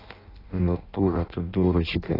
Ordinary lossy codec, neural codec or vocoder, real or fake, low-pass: AAC, 24 kbps; codec, 16 kHz in and 24 kHz out, 0.6 kbps, FireRedTTS-2 codec; fake; 5.4 kHz